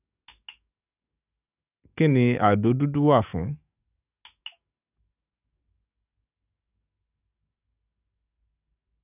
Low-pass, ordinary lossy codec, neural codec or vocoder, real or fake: 3.6 kHz; none; codec, 44.1 kHz, 7.8 kbps, DAC; fake